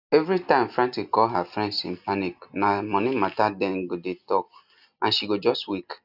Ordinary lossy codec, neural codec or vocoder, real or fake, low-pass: none; none; real; 5.4 kHz